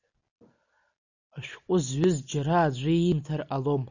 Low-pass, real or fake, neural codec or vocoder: 7.2 kHz; real; none